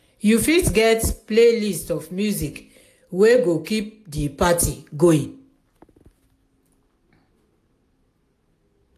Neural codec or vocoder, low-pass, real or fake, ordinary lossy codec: none; 14.4 kHz; real; AAC, 64 kbps